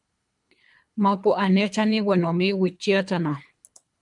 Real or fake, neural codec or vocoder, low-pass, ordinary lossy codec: fake; codec, 24 kHz, 3 kbps, HILCodec; 10.8 kHz; MP3, 96 kbps